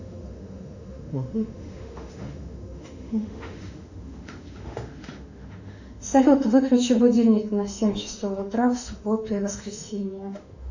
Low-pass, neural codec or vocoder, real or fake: 7.2 kHz; autoencoder, 48 kHz, 32 numbers a frame, DAC-VAE, trained on Japanese speech; fake